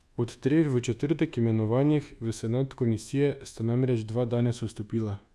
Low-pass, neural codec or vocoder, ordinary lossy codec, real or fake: none; codec, 24 kHz, 1.2 kbps, DualCodec; none; fake